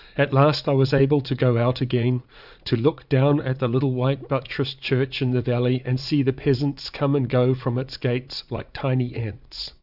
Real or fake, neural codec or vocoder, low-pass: fake; vocoder, 44.1 kHz, 80 mel bands, Vocos; 5.4 kHz